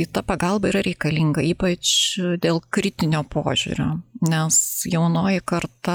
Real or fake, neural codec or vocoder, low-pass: real; none; 19.8 kHz